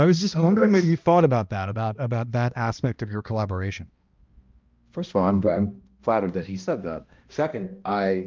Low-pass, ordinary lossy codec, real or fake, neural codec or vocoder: 7.2 kHz; Opus, 24 kbps; fake; codec, 16 kHz, 1 kbps, X-Codec, HuBERT features, trained on balanced general audio